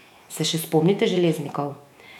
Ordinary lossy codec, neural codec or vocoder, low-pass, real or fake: none; autoencoder, 48 kHz, 128 numbers a frame, DAC-VAE, trained on Japanese speech; 19.8 kHz; fake